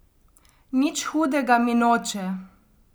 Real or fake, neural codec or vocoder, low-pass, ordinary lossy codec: real; none; none; none